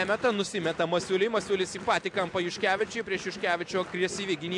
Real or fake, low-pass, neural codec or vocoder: real; 10.8 kHz; none